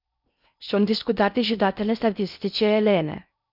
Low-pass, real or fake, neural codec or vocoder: 5.4 kHz; fake; codec, 16 kHz in and 24 kHz out, 0.6 kbps, FocalCodec, streaming, 4096 codes